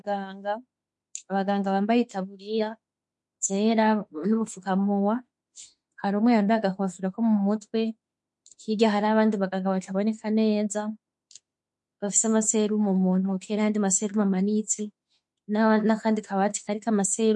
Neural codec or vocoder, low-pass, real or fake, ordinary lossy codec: autoencoder, 48 kHz, 32 numbers a frame, DAC-VAE, trained on Japanese speech; 10.8 kHz; fake; MP3, 48 kbps